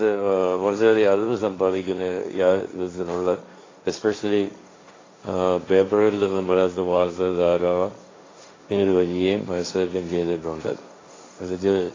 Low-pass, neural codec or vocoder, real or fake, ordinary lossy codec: 7.2 kHz; codec, 16 kHz, 1.1 kbps, Voila-Tokenizer; fake; AAC, 32 kbps